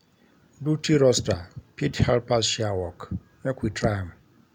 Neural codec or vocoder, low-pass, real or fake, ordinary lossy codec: none; 19.8 kHz; real; none